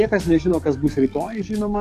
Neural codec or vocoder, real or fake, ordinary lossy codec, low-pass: codec, 44.1 kHz, 7.8 kbps, DAC; fake; MP3, 96 kbps; 14.4 kHz